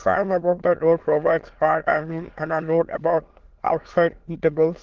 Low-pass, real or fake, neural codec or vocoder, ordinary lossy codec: 7.2 kHz; fake; autoencoder, 22.05 kHz, a latent of 192 numbers a frame, VITS, trained on many speakers; Opus, 16 kbps